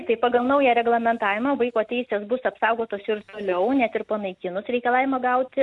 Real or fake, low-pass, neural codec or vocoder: real; 10.8 kHz; none